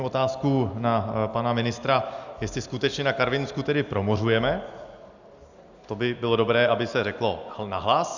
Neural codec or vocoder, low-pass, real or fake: none; 7.2 kHz; real